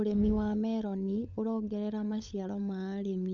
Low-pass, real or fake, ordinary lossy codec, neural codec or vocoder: 7.2 kHz; fake; none; codec, 16 kHz, 16 kbps, FunCodec, trained on LibriTTS, 50 frames a second